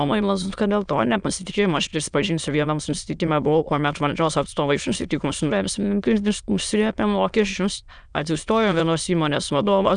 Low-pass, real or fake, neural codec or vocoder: 9.9 kHz; fake; autoencoder, 22.05 kHz, a latent of 192 numbers a frame, VITS, trained on many speakers